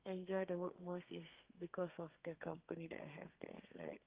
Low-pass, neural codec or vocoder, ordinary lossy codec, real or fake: 3.6 kHz; codec, 32 kHz, 1.9 kbps, SNAC; Opus, 32 kbps; fake